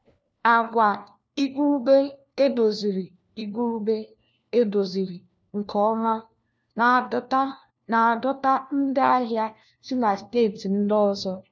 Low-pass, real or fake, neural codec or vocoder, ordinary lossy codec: none; fake; codec, 16 kHz, 1 kbps, FunCodec, trained on LibriTTS, 50 frames a second; none